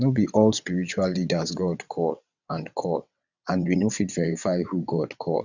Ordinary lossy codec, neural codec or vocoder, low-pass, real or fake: none; vocoder, 22.05 kHz, 80 mel bands, WaveNeXt; 7.2 kHz; fake